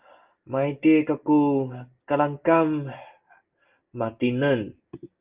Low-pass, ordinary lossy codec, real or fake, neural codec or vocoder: 3.6 kHz; Opus, 24 kbps; real; none